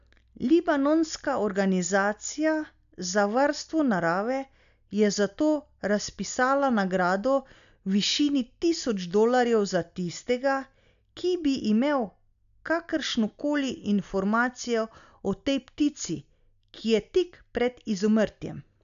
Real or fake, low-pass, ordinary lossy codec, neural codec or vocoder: real; 7.2 kHz; none; none